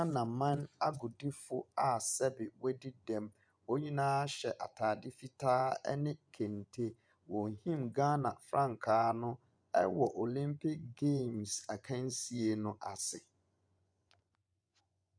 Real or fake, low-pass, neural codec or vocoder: fake; 9.9 kHz; vocoder, 24 kHz, 100 mel bands, Vocos